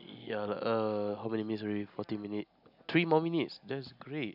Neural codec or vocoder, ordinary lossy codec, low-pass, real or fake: none; none; 5.4 kHz; real